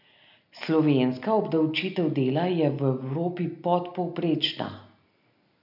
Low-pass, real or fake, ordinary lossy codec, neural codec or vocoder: 5.4 kHz; real; none; none